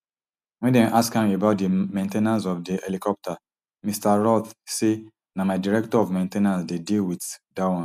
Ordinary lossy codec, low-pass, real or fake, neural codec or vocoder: none; 14.4 kHz; real; none